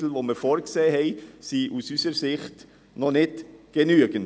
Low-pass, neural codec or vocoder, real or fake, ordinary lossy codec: none; none; real; none